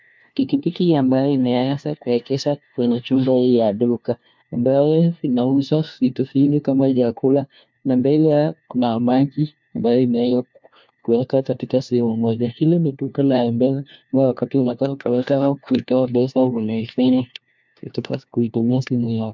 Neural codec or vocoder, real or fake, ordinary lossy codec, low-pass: codec, 16 kHz, 1 kbps, FunCodec, trained on LibriTTS, 50 frames a second; fake; MP3, 64 kbps; 7.2 kHz